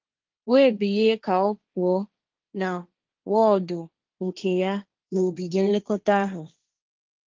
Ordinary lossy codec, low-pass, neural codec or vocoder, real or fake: Opus, 32 kbps; 7.2 kHz; codec, 16 kHz, 1.1 kbps, Voila-Tokenizer; fake